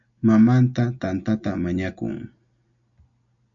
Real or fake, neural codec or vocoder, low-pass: real; none; 7.2 kHz